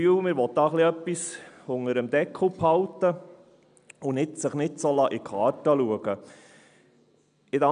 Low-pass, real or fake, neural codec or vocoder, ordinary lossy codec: 9.9 kHz; real; none; none